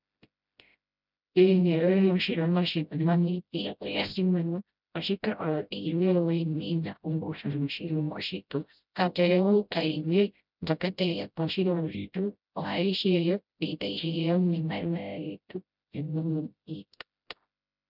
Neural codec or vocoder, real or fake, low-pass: codec, 16 kHz, 0.5 kbps, FreqCodec, smaller model; fake; 5.4 kHz